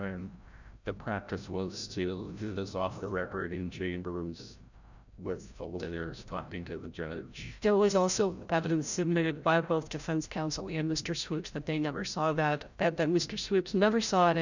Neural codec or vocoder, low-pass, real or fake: codec, 16 kHz, 0.5 kbps, FreqCodec, larger model; 7.2 kHz; fake